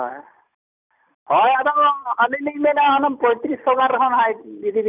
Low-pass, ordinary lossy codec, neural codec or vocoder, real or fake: 3.6 kHz; none; none; real